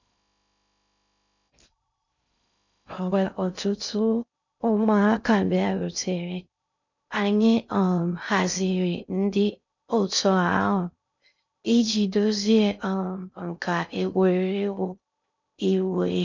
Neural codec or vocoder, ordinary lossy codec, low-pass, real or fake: codec, 16 kHz in and 24 kHz out, 0.6 kbps, FocalCodec, streaming, 2048 codes; none; 7.2 kHz; fake